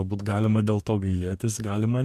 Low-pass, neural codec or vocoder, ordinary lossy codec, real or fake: 14.4 kHz; codec, 44.1 kHz, 2.6 kbps, DAC; MP3, 96 kbps; fake